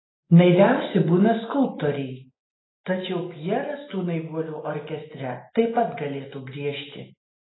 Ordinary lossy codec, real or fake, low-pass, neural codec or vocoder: AAC, 16 kbps; real; 7.2 kHz; none